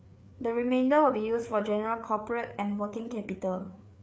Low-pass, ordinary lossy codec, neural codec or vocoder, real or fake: none; none; codec, 16 kHz, 4 kbps, FreqCodec, larger model; fake